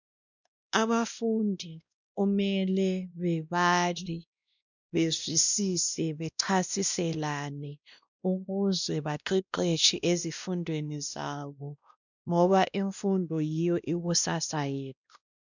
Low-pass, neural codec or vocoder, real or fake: 7.2 kHz; codec, 16 kHz, 1 kbps, X-Codec, WavLM features, trained on Multilingual LibriSpeech; fake